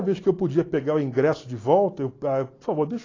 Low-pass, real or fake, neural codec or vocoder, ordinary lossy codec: 7.2 kHz; real; none; AAC, 32 kbps